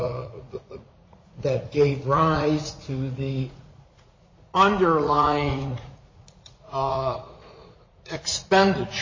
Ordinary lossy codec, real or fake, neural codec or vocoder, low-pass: MP3, 32 kbps; fake; vocoder, 44.1 kHz, 80 mel bands, Vocos; 7.2 kHz